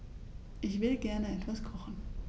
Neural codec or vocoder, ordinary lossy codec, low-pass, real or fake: none; none; none; real